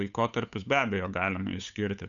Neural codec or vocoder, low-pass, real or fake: codec, 16 kHz, 8 kbps, FunCodec, trained on Chinese and English, 25 frames a second; 7.2 kHz; fake